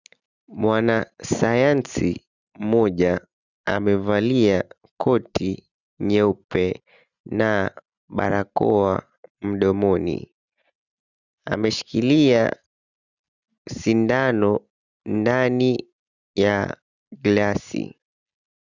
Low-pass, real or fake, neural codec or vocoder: 7.2 kHz; real; none